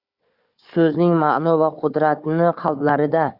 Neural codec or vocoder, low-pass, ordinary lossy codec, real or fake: codec, 16 kHz, 4 kbps, FunCodec, trained on Chinese and English, 50 frames a second; 5.4 kHz; Opus, 64 kbps; fake